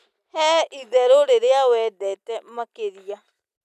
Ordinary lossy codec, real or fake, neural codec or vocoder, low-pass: none; real; none; 14.4 kHz